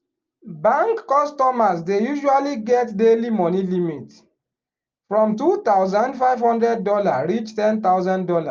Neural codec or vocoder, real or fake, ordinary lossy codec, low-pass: none; real; Opus, 24 kbps; 7.2 kHz